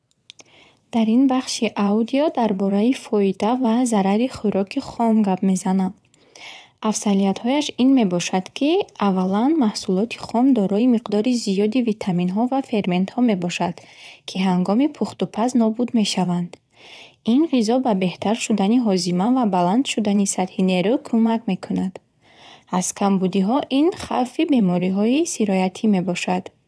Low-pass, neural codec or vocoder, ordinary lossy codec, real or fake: none; vocoder, 22.05 kHz, 80 mel bands, WaveNeXt; none; fake